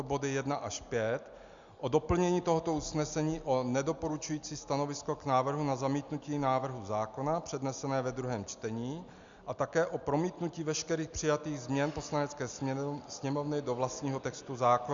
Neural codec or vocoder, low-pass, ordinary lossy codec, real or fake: none; 7.2 kHz; Opus, 64 kbps; real